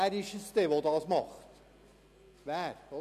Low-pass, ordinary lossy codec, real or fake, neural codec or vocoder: 14.4 kHz; none; real; none